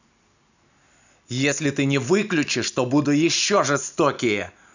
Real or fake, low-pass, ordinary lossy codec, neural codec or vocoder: real; 7.2 kHz; none; none